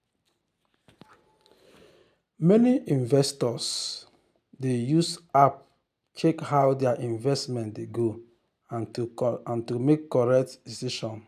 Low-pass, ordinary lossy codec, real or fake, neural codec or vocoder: 14.4 kHz; none; real; none